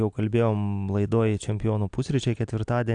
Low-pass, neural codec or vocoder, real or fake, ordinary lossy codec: 9.9 kHz; none; real; AAC, 64 kbps